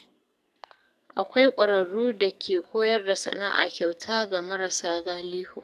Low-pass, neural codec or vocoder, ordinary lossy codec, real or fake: 14.4 kHz; codec, 44.1 kHz, 2.6 kbps, SNAC; none; fake